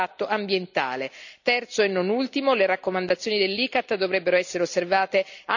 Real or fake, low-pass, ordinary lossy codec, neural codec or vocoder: real; 7.2 kHz; none; none